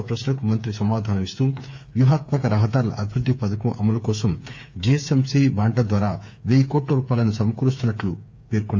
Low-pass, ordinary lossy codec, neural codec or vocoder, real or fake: none; none; codec, 16 kHz, 8 kbps, FreqCodec, smaller model; fake